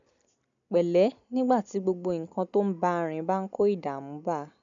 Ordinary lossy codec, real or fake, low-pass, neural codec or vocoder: none; real; 7.2 kHz; none